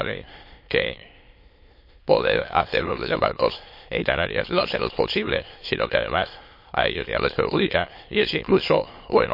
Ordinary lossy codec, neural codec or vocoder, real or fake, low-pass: MP3, 32 kbps; autoencoder, 22.05 kHz, a latent of 192 numbers a frame, VITS, trained on many speakers; fake; 5.4 kHz